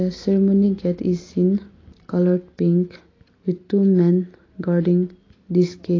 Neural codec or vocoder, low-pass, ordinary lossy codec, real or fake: none; 7.2 kHz; AAC, 32 kbps; real